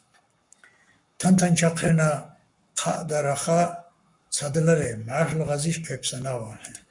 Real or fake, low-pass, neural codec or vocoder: fake; 10.8 kHz; codec, 44.1 kHz, 7.8 kbps, Pupu-Codec